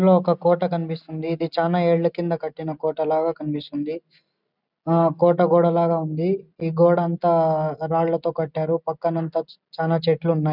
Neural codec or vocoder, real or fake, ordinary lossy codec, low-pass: none; real; none; 5.4 kHz